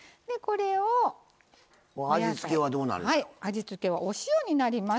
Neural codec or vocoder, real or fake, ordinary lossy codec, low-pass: none; real; none; none